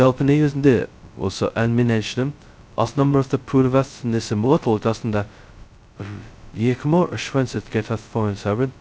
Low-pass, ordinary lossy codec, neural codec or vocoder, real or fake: none; none; codec, 16 kHz, 0.2 kbps, FocalCodec; fake